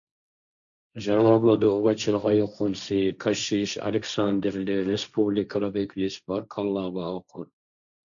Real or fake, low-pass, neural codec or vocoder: fake; 7.2 kHz; codec, 16 kHz, 1.1 kbps, Voila-Tokenizer